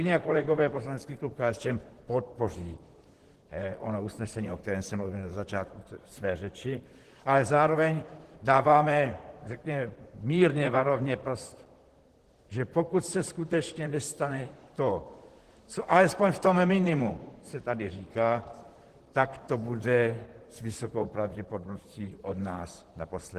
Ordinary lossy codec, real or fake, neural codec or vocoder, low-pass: Opus, 16 kbps; fake; vocoder, 44.1 kHz, 128 mel bands, Pupu-Vocoder; 14.4 kHz